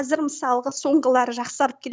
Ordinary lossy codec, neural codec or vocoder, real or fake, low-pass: none; none; real; none